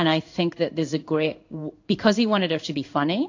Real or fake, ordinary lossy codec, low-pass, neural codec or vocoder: fake; AAC, 48 kbps; 7.2 kHz; codec, 16 kHz in and 24 kHz out, 1 kbps, XY-Tokenizer